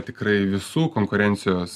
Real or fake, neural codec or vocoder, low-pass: real; none; 14.4 kHz